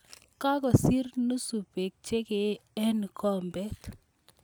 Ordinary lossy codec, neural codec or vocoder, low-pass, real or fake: none; none; none; real